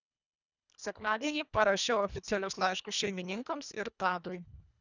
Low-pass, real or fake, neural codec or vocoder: 7.2 kHz; fake; codec, 24 kHz, 1.5 kbps, HILCodec